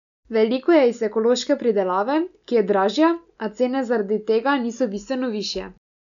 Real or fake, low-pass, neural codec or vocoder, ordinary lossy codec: real; 7.2 kHz; none; none